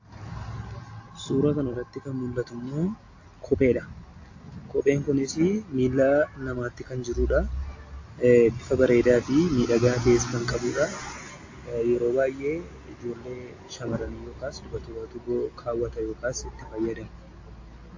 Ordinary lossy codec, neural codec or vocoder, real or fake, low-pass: AAC, 48 kbps; none; real; 7.2 kHz